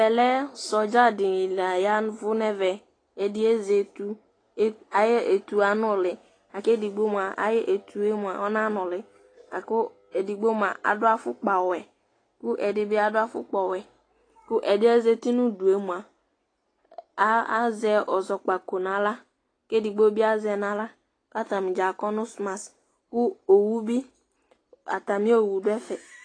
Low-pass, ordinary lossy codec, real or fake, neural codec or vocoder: 9.9 kHz; AAC, 32 kbps; real; none